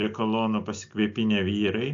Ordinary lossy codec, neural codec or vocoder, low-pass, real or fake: AAC, 64 kbps; none; 7.2 kHz; real